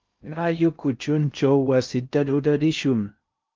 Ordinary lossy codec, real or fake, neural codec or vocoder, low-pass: Opus, 24 kbps; fake; codec, 16 kHz in and 24 kHz out, 0.6 kbps, FocalCodec, streaming, 2048 codes; 7.2 kHz